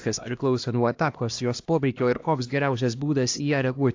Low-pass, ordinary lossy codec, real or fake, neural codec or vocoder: 7.2 kHz; AAC, 48 kbps; fake; codec, 16 kHz, 1 kbps, X-Codec, HuBERT features, trained on LibriSpeech